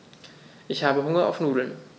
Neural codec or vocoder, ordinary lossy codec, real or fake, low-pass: none; none; real; none